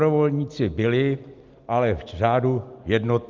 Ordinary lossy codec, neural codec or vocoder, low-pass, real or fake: Opus, 24 kbps; none; 7.2 kHz; real